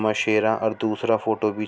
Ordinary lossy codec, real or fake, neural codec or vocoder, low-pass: none; real; none; none